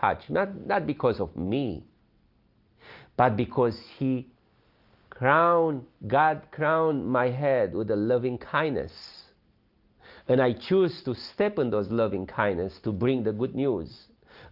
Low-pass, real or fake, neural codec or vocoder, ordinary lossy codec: 5.4 kHz; real; none; Opus, 24 kbps